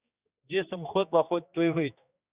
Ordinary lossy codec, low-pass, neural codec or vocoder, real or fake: Opus, 64 kbps; 3.6 kHz; codec, 16 kHz, 2 kbps, X-Codec, HuBERT features, trained on general audio; fake